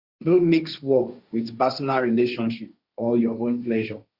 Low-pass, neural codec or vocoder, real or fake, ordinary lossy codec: 5.4 kHz; codec, 16 kHz, 1.1 kbps, Voila-Tokenizer; fake; Opus, 64 kbps